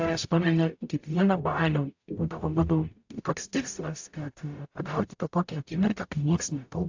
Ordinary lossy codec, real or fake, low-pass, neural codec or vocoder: AAC, 48 kbps; fake; 7.2 kHz; codec, 44.1 kHz, 0.9 kbps, DAC